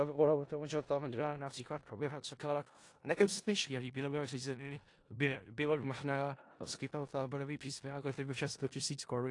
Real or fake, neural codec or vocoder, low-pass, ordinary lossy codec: fake; codec, 16 kHz in and 24 kHz out, 0.4 kbps, LongCat-Audio-Codec, four codebook decoder; 10.8 kHz; AAC, 48 kbps